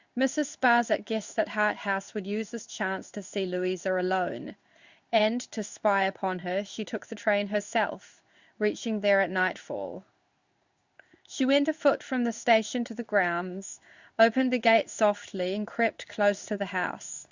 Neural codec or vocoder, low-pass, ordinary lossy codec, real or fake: codec, 16 kHz in and 24 kHz out, 1 kbps, XY-Tokenizer; 7.2 kHz; Opus, 64 kbps; fake